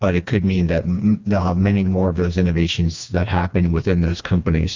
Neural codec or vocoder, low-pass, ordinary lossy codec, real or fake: codec, 16 kHz, 2 kbps, FreqCodec, smaller model; 7.2 kHz; MP3, 64 kbps; fake